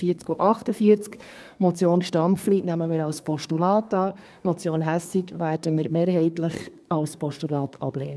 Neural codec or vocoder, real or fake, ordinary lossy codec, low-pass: codec, 24 kHz, 1 kbps, SNAC; fake; none; none